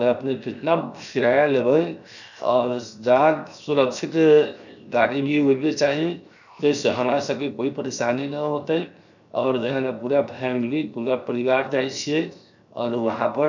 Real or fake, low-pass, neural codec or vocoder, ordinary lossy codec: fake; 7.2 kHz; codec, 16 kHz, 0.7 kbps, FocalCodec; none